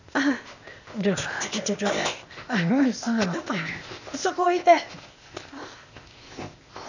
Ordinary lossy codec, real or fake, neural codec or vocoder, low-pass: none; fake; codec, 16 kHz, 0.8 kbps, ZipCodec; 7.2 kHz